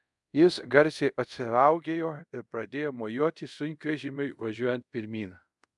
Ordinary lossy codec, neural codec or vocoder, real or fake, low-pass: MP3, 96 kbps; codec, 24 kHz, 0.5 kbps, DualCodec; fake; 10.8 kHz